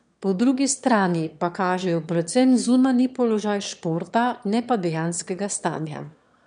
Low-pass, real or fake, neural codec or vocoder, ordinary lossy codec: 9.9 kHz; fake; autoencoder, 22.05 kHz, a latent of 192 numbers a frame, VITS, trained on one speaker; none